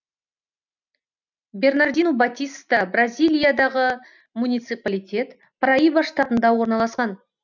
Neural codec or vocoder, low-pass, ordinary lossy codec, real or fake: none; 7.2 kHz; none; real